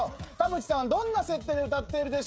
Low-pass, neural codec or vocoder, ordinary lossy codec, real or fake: none; codec, 16 kHz, 16 kbps, FreqCodec, smaller model; none; fake